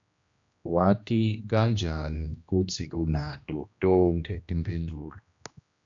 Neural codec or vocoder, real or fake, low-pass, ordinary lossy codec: codec, 16 kHz, 1 kbps, X-Codec, HuBERT features, trained on general audio; fake; 7.2 kHz; AAC, 64 kbps